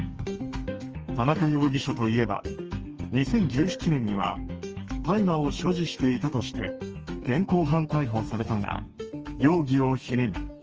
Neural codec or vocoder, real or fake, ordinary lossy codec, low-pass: codec, 32 kHz, 1.9 kbps, SNAC; fake; Opus, 24 kbps; 7.2 kHz